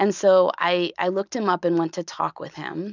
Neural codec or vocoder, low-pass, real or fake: none; 7.2 kHz; real